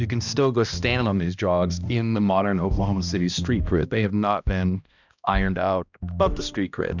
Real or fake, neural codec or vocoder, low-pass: fake; codec, 16 kHz, 1 kbps, X-Codec, HuBERT features, trained on balanced general audio; 7.2 kHz